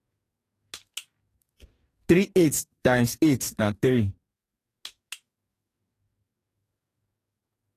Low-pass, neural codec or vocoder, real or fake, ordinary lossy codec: 14.4 kHz; codec, 44.1 kHz, 2.6 kbps, DAC; fake; AAC, 48 kbps